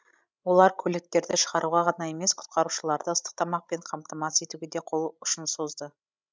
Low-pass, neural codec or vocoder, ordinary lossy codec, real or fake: none; codec, 16 kHz, 16 kbps, FreqCodec, larger model; none; fake